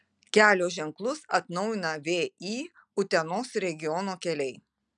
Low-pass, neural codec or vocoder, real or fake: 9.9 kHz; none; real